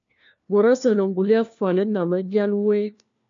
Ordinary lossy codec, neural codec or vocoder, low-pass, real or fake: AAC, 64 kbps; codec, 16 kHz, 1 kbps, FunCodec, trained on LibriTTS, 50 frames a second; 7.2 kHz; fake